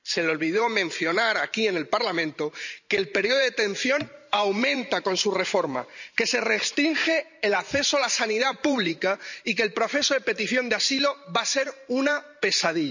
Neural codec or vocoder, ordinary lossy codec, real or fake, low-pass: vocoder, 44.1 kHz, 128 mel bands every 512 samples, BigVGAN v2; none; fake; 7.2 kHz